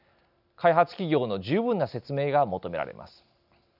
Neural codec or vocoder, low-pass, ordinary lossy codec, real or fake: none; 5.4 kHz; none; real